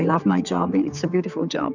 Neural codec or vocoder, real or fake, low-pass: codec, 16 kHz, 4 kbps, X-Codec, HuBERT features, trained on general audio; fake; 7.2 kHz